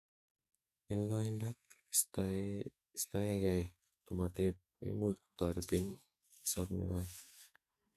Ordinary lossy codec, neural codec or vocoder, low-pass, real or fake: none; codec, 44.1 kHz, 2.6 kbps, SNAC; 14.4 kHz; fake